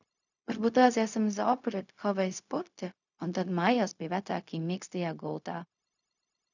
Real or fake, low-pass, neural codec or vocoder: fake; 7.2 kHz; codec, 16 kHz, 0.4 kbps, LongCat-Audio-Codec